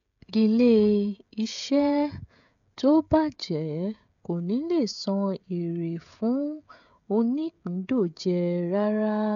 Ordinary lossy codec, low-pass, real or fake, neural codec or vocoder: none; 7.2 kHz; fake; codec, 16 kHz, 16 kbps, FreqCodec, smaller model